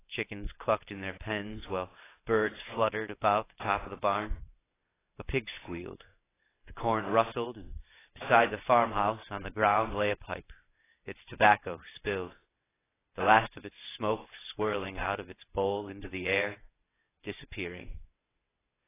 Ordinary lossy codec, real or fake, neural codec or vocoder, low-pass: AAC, 16 kbps; fake; vocoder, 22.05 kHz, 80 mel bands, WaveNeXt; 3.6 kHz